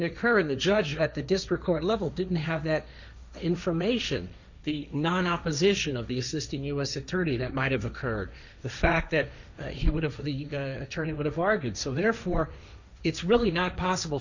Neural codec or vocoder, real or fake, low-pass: codec, 16 kHz, 1.1 kbps, Voila-Tokenizer; fake; 7.2 kHz